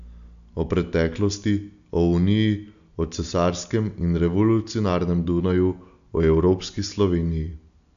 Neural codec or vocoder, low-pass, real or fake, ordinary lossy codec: none; 7.2 kHz; real; none